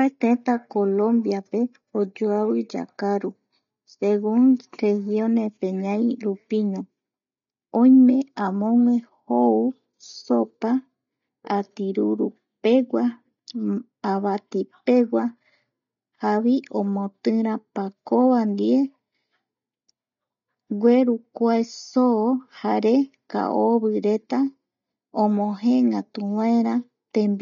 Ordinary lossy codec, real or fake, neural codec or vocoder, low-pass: none; real; none; 7.2 kHz